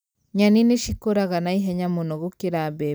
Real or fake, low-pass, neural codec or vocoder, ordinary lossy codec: real; none; none; none